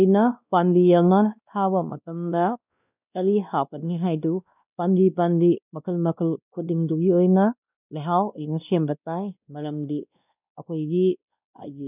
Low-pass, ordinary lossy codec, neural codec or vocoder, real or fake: 3.6 kHz; none; codec, 16 kHz, 1 kbps, X-Codec, WavLM features, trained on Multilingual LibriSpeech; fake